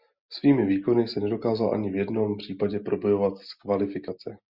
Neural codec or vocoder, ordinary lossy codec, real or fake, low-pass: none; Opus, 64 kbps; real; 5.4 kHz